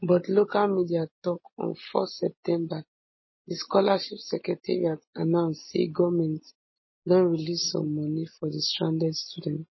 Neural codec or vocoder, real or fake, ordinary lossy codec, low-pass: none; real; MP3, 24 kbps; 7.2 kHz